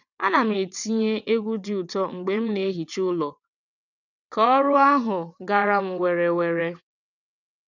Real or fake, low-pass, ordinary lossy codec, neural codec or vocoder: fake; 7.2 kHz; none; vocoder, 22.05 kHz, 80 mel bands, WaveNeXt